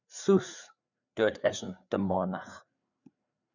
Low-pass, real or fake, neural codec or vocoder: 7.2 kHz; fake; codec, 16 kHz, 4 kbps, FreqCodec, larger model